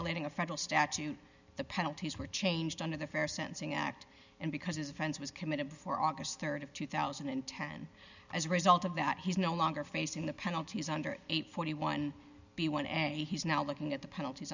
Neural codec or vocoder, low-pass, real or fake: none; 7.2 kHz; real